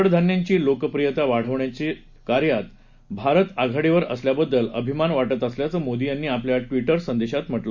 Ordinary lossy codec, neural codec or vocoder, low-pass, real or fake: none; none; 7.2 kHz; real